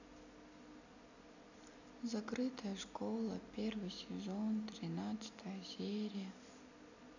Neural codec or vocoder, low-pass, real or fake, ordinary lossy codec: none; 7.2 kHz; real; none